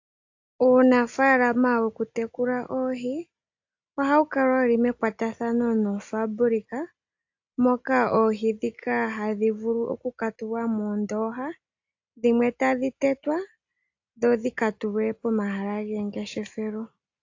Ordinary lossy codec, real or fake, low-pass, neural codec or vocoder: AAC, 48 kbps; real; 7.2 kHz; none